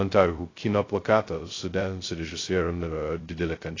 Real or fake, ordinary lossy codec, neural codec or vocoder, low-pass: fake; AAC, 32 kbps; codec, 16 kHz, 0.2 kbps, FocalCodec; 7.2 kHz